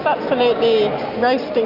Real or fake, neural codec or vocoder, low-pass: real; none; 5.4 kHz